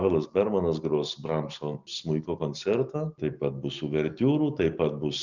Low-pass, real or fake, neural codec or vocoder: 7.2 kHz; real; none